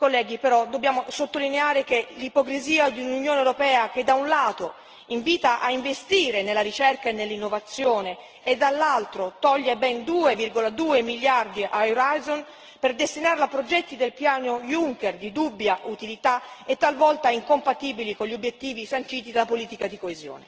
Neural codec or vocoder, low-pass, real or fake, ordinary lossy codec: none; 7.2 kHz; real; Opus, 16 kbps